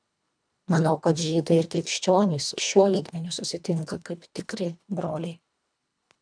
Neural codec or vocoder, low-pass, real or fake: codec, 24 kHz, 1.5 kbps, HILCodec; 9.9 kHz; fake